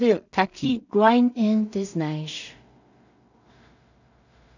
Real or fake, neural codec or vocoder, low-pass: fake; codec, 16 kHz in and 24 kHz out, 0.4 kbps, LongCat-Audio-Codec, two codebook decoder; 7.2 kHz